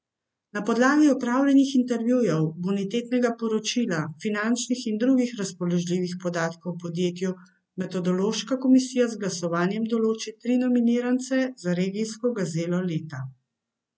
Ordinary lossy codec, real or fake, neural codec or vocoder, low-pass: none; real; none; none